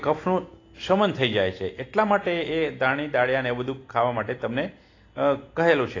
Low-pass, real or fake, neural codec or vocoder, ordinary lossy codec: 7.2 kHz; real; none; AAC, 32 kbps